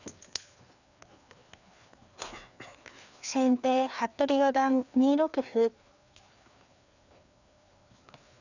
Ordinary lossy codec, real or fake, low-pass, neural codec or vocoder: none; fake; 7.2 kHz; codec, 16 kHz, 2 kbps, FreqCodec, larger model